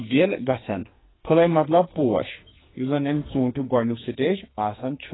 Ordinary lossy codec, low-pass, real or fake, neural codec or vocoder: AAC, 16 kbps; 7.2 kHz; fake; codec, 44.1 kHz, 2.6 kbps, SNAC